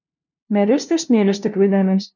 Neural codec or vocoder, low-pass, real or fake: codec, 16 kHz, 0.5 kbps, FunCodec, trained on LibriTTS, 25 frames a second; 7.2 kHz; fake